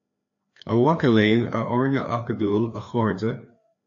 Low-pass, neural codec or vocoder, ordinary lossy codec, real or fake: 7.2 kHz; codec, 16 kHz, 2 kbps, FreqCodec, larger model; AAC, 48 kbps; fake